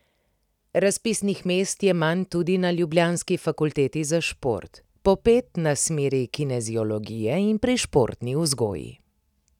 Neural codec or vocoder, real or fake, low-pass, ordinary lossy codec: none; real; 19.8 kHz; none